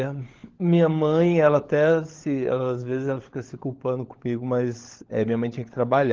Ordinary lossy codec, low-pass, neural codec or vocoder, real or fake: Opus, 16 kbps; 7.2 kHz; codec, 16 kHz, 16 kbps, FunCodec, trained on LibriTTS, 50 frames a second; fake